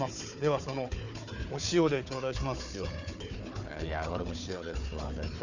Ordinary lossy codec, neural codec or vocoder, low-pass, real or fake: none; codec, 16 kHz, 4 kbps, FunCodec, trained on Chinese and English, 50 frames a second; 7.2 kHz; fake